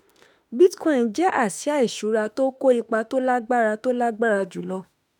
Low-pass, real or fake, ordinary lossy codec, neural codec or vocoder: 19.8 kHz; fake; none; autoencoder, 48 kHz, 32 numbers a frame, DAC-VAE, trained on Japanese speech